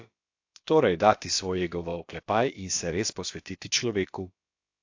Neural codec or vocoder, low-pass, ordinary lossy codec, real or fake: codec, 16 kHz, about 1 kbps, DyCAST, with the encoder's durations; 7.2 kHz; AAC, 48 kbps; fake